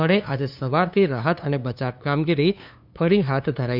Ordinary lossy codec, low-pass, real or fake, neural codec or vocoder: none; 5.4 kHz; fake; codec, 16 kHz, 2 kbps, FunCodec, trained on LibriTTS, 25 frames a second